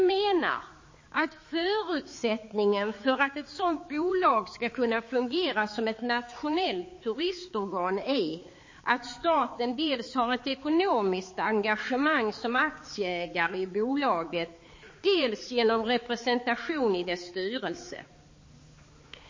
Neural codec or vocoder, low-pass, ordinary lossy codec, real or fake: codec, 16 kHz, 4 kbps, X-Codec, HuBERT features, trained on balanced general audio; 7.2 kHz; MP3, 32 kbps; fake